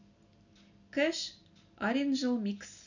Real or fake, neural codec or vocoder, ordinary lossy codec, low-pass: real; none; none; 7.2 kHz